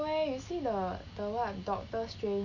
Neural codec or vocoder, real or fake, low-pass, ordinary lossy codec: none; real; 7.2 kHz; none